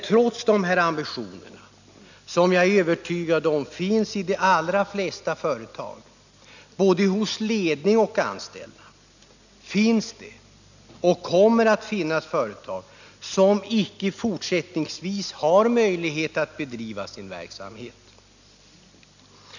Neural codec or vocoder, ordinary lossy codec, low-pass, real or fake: none; none; 7.2 kHz; real